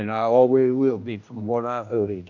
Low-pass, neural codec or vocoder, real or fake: 7.2 kHz; codec, 16 kHz, 1 kbps, X-Codec, HuBERT features, trained on general audio; fake